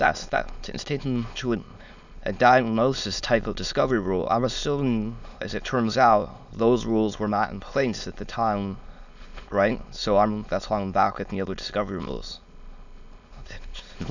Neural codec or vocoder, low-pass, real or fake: autoencoder, 22.05 kHz, a latent of 192 numbers a frame, VITS, trained on many speakers; 7.2 kHz; fake